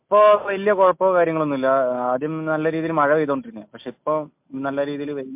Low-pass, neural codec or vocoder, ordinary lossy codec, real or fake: 3.6 kHz; none; MP3, 32 kbps; real